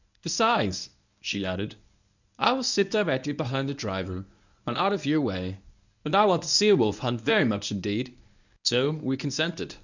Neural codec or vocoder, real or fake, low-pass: codec, 24 kHz, 0.9 kbps, WavTokenizer, medium speech release version 1; fake; 7.2 kHz